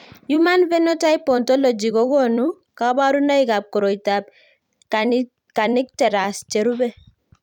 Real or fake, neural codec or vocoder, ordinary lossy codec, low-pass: fake; vocoder, 44.1 kHz, 128 mel bands every 256 samples, BigVGAN v2; none; 19.8 kHz